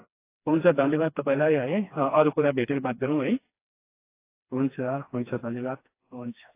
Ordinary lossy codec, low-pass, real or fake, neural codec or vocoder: AAC, 24 kbps; 3.6 kHz; fake; codec, 16 kHz, 2 kbps, FreqCodec, smaller model